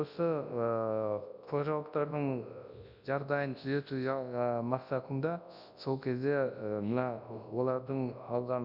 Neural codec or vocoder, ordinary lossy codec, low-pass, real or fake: codec, 24 kHz, 0.9 kbps, WavTokenizer, large speech release; none; 5.4 kHz; fake